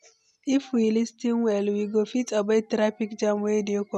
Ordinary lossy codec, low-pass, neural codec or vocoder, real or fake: none; none; none; real